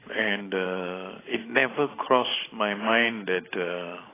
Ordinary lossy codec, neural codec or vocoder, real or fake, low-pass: AAC, 16 kbps; vocoder, 44.1 kHz, 128 mel bands every 512 samples, BigVGAN v2; fake; 3.6 kHz